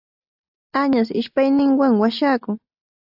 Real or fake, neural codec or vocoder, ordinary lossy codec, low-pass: real; none; AAC, 48 kbps; 5.4 kHz